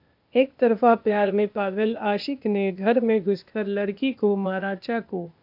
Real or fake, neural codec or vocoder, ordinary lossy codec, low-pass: fake; codec, 16 kHz, 0.8 kbps, ZipCodec; AAC, 48 kbps; 5.4 kHz